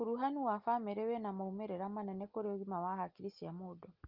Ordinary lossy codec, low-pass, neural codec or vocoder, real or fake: Opus, 24 kbps; 5.4 kHz; none; real